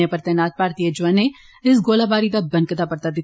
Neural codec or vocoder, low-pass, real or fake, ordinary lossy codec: none; none; real; none